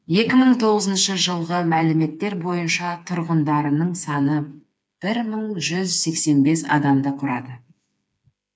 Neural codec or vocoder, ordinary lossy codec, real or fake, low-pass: codec, 16 kHz, 4 kbps, FreqCodec, smaller model; none; fake; none